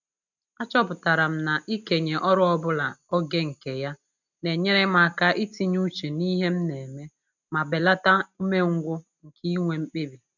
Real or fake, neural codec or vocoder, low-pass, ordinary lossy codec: real; none; 7.2 kHz; none